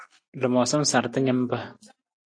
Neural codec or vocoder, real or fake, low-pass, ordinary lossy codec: none; real; 9.9 kHz; AAC, 48 kbps